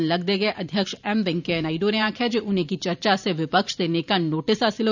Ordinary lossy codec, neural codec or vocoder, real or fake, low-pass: none; vocoder, 44.1 kHz, 128 mel bands every 512 samples, BigVGAN v2; fake; 7.2 kHz